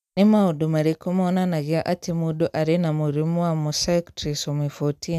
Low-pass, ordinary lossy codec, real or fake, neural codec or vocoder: 14.4 kHz; none; real; none